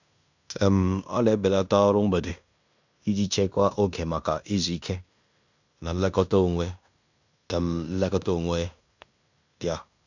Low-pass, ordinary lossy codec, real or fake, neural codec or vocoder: 7.2 kHz; none; fake; codec, 16 kHz in and 24 kHz out, 0.9 kbps, LongCat-Audio-Codec, fine tuned four codebook decoder